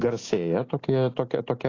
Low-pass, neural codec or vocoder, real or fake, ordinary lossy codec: 7.2 kHz; none; real; AAC, 32 kbps